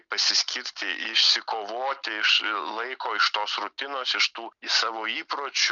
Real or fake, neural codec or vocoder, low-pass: real; none; 7.2 kHz